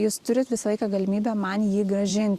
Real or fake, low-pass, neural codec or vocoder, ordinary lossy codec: fake; 14.4 kHz; vocoder, 44.1 kHz, 128 mel bands every 512 samples, BigVGAN v2; Opus, 64 kbps